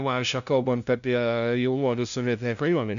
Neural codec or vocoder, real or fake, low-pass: codec, 16 kHz, 0.5 kbps, FunCodec, trained on LibriTTS, 25 frames a second; fake; 7.2 kHz